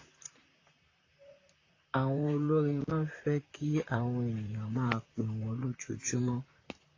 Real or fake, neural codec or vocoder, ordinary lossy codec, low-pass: fake; vocoder, 44.1 kHz, 128 mel bands, Pupu-Vocoder; AAC, 32 kbps; 7.2 kHz